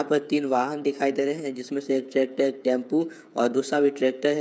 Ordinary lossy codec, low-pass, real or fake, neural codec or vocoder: none; none; fake; codec, 16 kHz, 8 kbps, FreqCodec, smaller model